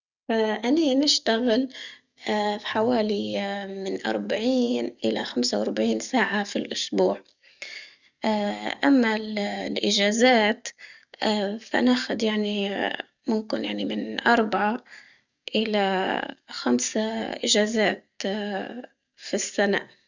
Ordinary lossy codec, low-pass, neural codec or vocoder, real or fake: none; 7.2 kHz; codec, 44.1 kHz, 7.8 kbps, DAC; fake